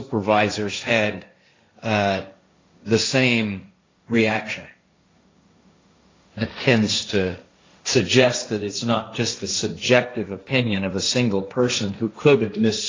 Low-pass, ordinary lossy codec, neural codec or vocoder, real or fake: 7.2 kHz; AAC, 32 kbps; codec, 16 kHz, 1.1 kbps, Voila-Tokenizer; fake